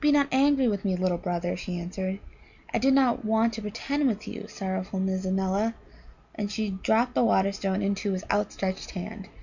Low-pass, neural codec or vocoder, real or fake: 7.2 kHz; none; real